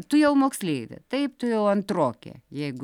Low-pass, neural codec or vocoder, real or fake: 19.8 kHz; none; real